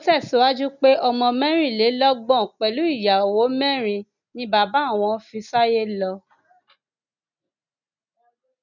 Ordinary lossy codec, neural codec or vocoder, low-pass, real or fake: none; none; 7.2 kHz; real